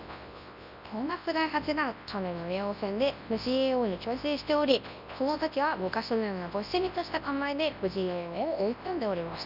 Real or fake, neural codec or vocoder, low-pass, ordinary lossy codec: fake; codec, 24 kHz, 0.9 kbps, WavTokenizer, large speech release; 5.4 kHz; none